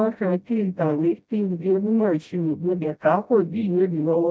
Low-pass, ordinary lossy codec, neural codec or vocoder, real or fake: none; none; codec, 16 kHz, 0.5 kbps, FreqCodec, smaller model; fake